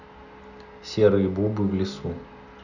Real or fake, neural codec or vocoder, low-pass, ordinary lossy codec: real; none; 7.2 kHz; none